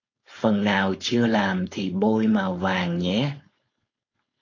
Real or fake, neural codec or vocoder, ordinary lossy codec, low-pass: fake; codec, 16 kHz, 4.8 kbps, FACodec; AAC, 32 kbps; 7.2 kHz